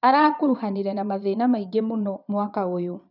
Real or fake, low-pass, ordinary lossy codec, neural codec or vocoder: fake; 5.4 kHz; none; vocoder, 22.05 kHz, 80 mel bands, Vocos